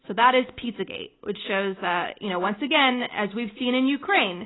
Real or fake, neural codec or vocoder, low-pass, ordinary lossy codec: real; none; 7.2 kHz; AAC, 16 kbps